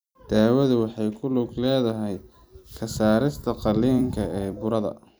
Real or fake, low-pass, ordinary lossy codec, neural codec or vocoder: fake; none; none; vocoder, 44.1 kHz, 128 mel bands every 256 samples, BigVGAN v2